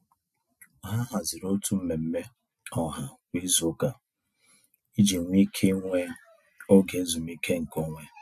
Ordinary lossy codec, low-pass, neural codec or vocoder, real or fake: none; 14.4 kHz; none; real